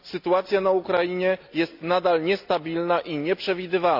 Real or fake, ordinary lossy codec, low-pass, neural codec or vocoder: real; none; 5.4 kHz; none